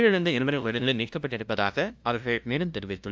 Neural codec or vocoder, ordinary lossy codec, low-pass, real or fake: codec, 16 kHz, 0.5 kbps, FunCodec, trained on LibriTTS, 25 frames a second; none; none; fake